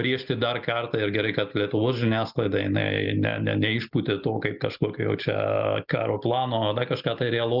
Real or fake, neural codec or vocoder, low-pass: real; none; 5.4 kHz